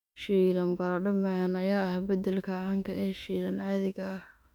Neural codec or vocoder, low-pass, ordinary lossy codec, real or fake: autoencoder, 48 kHz, 32 numbers a frame, DAC-VAE, trained on Japanese speech; 19.8 kHz; none; fake